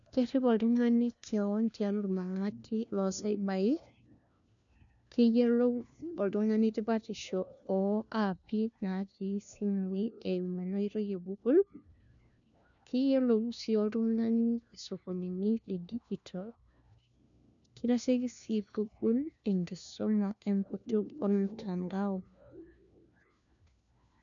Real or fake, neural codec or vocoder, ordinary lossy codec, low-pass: fake; codec, 16 kHz, 1 kbps, FunCodec, trained on LibriTTS, 50 frames a second; none; 7.2 kHz